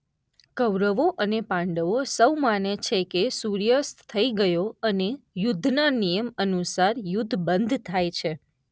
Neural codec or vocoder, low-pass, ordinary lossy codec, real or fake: none; none; none; real